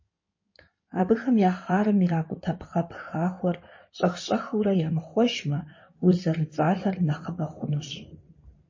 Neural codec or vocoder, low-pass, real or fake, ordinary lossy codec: codec, 16 kHz in and 24 kHz out, 2.2 kbps, FireRedTTS-2 codec; 7.2 kHz; fake; MP3, 32 kbps